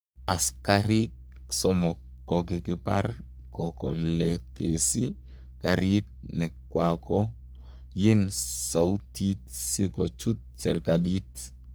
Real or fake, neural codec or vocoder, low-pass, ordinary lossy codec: fake; codec, 44.1 kHz, 3.4 kbps, Pupu-Codec; none; none